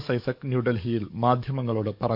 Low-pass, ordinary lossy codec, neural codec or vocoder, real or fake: 5.4 kHz; none; codec, 44.1 kHz, 7.8 kbps, DAC; fake